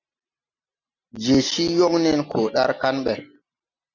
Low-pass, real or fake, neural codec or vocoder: 7.2 kHz; real; none